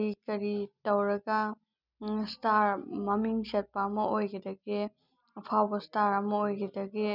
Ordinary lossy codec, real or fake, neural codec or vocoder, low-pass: none; real; none; 5.4 kHz